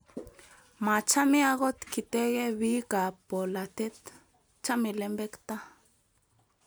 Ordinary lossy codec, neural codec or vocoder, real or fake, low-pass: none; none; real; none